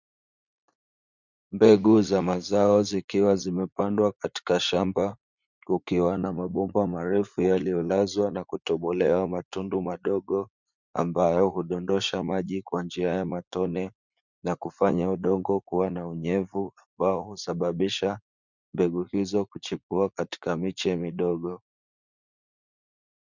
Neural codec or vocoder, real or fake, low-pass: vocoder, 44.1 kHz, 80 mel bands, Vocos; fake; 7.2 kHz